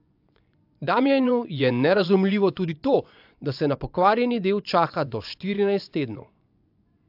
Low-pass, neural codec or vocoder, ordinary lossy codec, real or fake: 5.4 kHz; vocoder, 24 kHz, 100 mel bands, Vocos; AAC, 48 kbps; fake